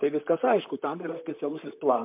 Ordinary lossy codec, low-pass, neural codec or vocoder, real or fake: MP3, 32 kbps; 3.6 kHz; vocoder, 44.1 kHz, 128 mel bands, Pupu-Vocoder; fake